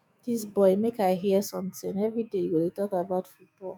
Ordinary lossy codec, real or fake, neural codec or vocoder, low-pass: none; fake; autoencoder, 48 kHz, 128 numbers a frame, DAC-VAE, trained on Japanese speech; 19.8 kHz